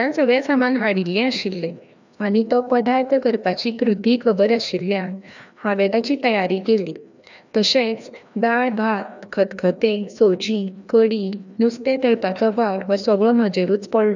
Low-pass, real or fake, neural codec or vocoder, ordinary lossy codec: 7.2 kHz; fake; codec, 16 kHz, 1 kbps, FreqCodec, larger model; none